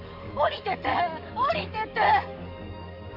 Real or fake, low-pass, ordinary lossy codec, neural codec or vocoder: fake; 5.4 kHz; none; codec, 44.1 kHz, 7.8 kbps, DAC